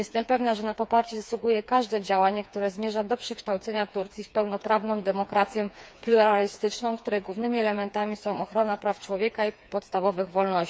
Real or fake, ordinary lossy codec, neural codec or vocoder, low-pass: fake; none; codec, 16 kHz, 4 kbps, FreqCodec, smaller model; none